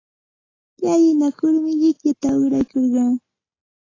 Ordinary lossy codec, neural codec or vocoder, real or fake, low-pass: AAC, 32 kbps; none; real; 7.2 kHz